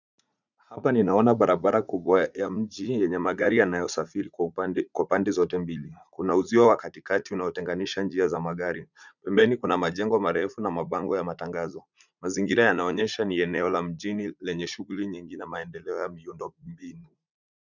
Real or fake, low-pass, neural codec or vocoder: fake; 7.2 kHz; vocoder, 44.1 kHz, 80 mel bands, Vocos